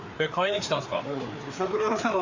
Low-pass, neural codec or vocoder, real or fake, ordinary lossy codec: 7.2 kHz; codec, 16 kHz, 4 kbps, FreqCodec, larger model; fake; MP3, 64 kbps